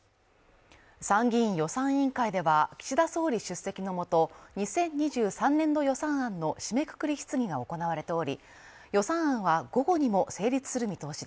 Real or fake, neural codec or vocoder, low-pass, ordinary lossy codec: real; none; none; none